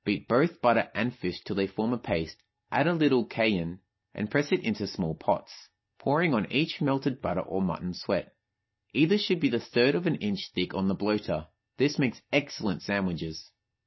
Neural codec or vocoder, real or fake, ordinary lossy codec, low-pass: none; real; MP3, 24 kbps; 7.2 kHz